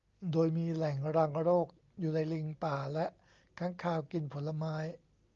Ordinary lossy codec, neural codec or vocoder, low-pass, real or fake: Opus, 32 kbps; none; 7.2 kHz; real